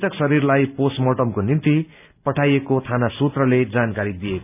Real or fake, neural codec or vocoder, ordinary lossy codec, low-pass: real; none; none; 3.6 kHz